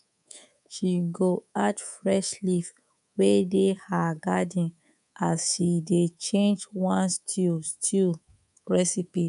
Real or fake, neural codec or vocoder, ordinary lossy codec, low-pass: fake; codec, 24 kHz, 3.1 kbps, DualCodec; none; 10.8 kHz